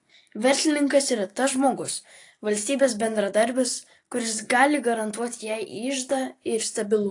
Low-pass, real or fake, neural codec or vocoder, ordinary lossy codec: 10.8 kHz; real; none; AAC, 48 kbps